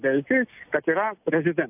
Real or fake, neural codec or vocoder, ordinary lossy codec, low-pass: real; none; AAC, 32 kbps; 3.6 kHz